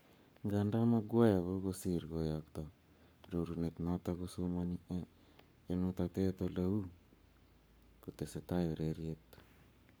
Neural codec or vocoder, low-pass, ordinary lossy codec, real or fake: codec, 44.1 kHz, 7.8 kbps, Pupu-Codec; none; none; fake